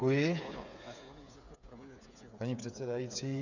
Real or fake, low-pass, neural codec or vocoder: fake; 7.2 kHz; codec, 16 kHz, 8 kbps, FreqCodec, smaller model